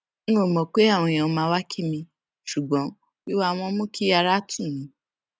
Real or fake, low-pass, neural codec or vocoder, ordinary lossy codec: real; none; none; none